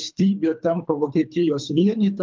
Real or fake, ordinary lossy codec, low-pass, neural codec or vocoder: fake; Opus, 24 kbps; 7.2 kHz; codec, 24 kHz, 3 kbps, HILCodec